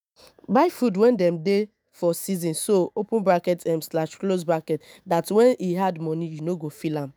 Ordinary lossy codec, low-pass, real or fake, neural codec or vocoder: none; none; fake; autoencoder, 48 kHz, 128 numbers a frame, DAC-VAE, trained on Japanese speech